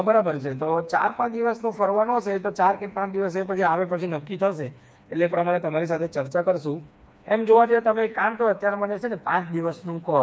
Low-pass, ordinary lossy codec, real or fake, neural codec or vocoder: none; none; fake; codec, 16 kHz, 2 kbps, FreqCodec, smaller model